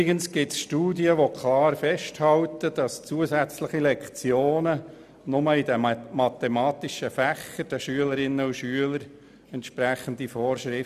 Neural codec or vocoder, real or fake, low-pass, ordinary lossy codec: none; real; 14.4 kHz; none